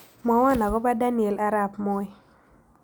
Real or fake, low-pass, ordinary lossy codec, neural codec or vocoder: real; none; none; none